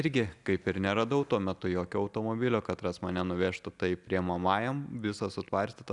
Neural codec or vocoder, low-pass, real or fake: none; 10.8 kHz; real